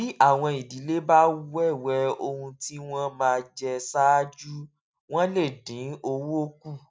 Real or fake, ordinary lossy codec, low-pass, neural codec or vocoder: real; none; none; none